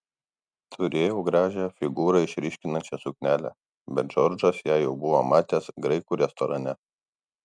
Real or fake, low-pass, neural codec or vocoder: real; 9.9 kHz; none